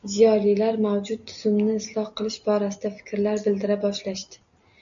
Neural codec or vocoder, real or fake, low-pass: none; real; 7.2 kHz